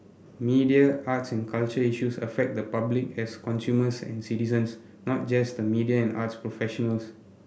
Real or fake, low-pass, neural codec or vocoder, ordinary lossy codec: real; none; none; none